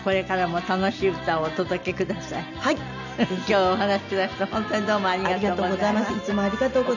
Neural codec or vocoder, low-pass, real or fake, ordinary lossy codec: none; 7.2 kHz; real; none